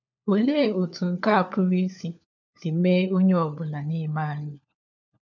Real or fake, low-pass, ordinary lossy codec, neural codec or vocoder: fake; 7.2 kHz; none; codec, 16 kHz, 4 kbps, FunCodec, trained on LibriTTS, 50 frames a second